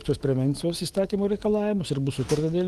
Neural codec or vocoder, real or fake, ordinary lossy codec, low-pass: autoencoder, 48 kHz, 128 numbers a frame, DAC-VAE, trained on Japanese speech; fake; Opus, 24 kbps; 14.4 kHz